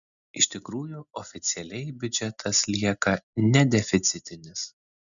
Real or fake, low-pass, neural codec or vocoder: real; 7.2 kHz; none